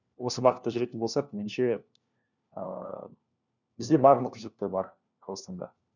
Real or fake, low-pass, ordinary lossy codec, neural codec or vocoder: fake; 7.2 kHz; none; codec, 16 kHz, 1 kbps, FunCodec, trained on LibriTTS, 50 frames a second